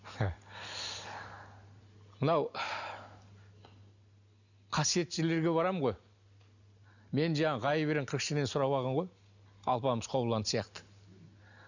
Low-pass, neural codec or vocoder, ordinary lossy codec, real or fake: 7.2 kHz; none; none; real